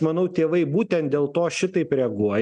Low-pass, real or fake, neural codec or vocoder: 10.8 kHz; real; none